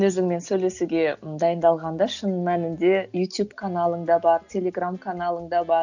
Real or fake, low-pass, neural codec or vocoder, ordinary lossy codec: real; 7.2 kHz; none; none